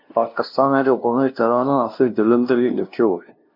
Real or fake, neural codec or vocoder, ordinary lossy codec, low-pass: fake; codec, 16 kHz, 0.5 kbps, FunCodec, trained on LibriTTS, 25 frames a second; MP3, 48 kbps; 5.4 kHz